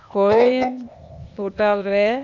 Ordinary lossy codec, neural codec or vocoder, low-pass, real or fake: none; codec, 16 kHz, 0.8 kbps, ZipCodec; 7.2 kHz; fake